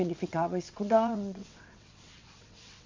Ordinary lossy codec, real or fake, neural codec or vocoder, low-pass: MP3, 64 kbps; fake; vocoder, 22.05 kHz, 80 mel bands, WaveNeXt; 7.2 kHz